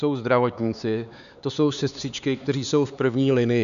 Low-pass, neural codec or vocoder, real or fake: 7.2 kHz; codec, 16 kHz, 4 kbps, X-Codec, HuBERT features, trained on LibriSpeech; fake